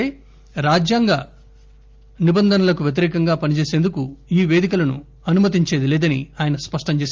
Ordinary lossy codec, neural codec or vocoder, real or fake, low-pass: Opus, 32 kbps; none; real; 7.2 kHz